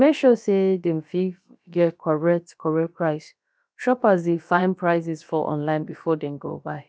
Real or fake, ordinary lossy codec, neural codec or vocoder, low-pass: fake; none; codec, 16 kHz, about 1 kbps, DyCAST, with the encoder's durations; none